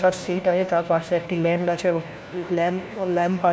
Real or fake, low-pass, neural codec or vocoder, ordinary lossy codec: fake; none; codec, 16 kHz, 1 kbps, FunCodec, trained on LibriTTS, 50 frames a second; none